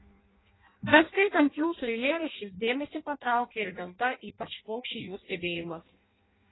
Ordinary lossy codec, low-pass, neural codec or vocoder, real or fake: AAC, 16 kbps; 7.2 kHz; codec, 16 kHz in and 24 kHz out, 0.6 kbps, FireRedTTS-2 codec; fake